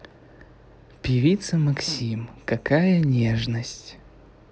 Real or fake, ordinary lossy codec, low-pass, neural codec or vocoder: real; none; none; none